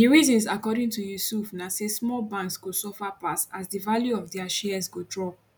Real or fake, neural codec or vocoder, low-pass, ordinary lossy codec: real; none; 19.8 kHz; none